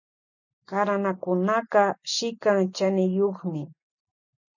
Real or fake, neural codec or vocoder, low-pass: real; none; 7.2 kHz